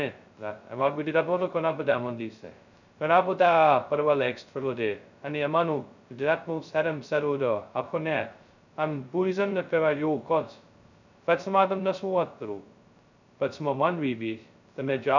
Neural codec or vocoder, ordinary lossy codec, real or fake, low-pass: codec, 16 kHz, 0.2 kbps, FocalCodec; none; fake; 7.2 kHz